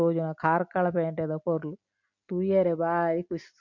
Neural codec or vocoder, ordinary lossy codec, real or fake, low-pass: none; MP3, 48 kbps; real; 7.2 kHz